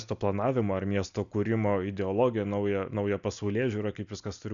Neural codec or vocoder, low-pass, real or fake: none; 7.2 kHz; real